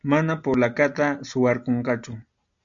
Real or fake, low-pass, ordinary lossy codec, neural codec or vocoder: real; 7.2 kHz; MP3, 96 kbps; none